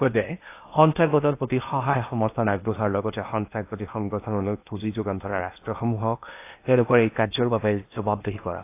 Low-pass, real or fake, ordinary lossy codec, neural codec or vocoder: 3.6 kHz; fake; AAC, 24 kbps; codec, 16 kHz in and 24 kHz out, 0.8 kbps, FocalCodec, streaming, 65536 codes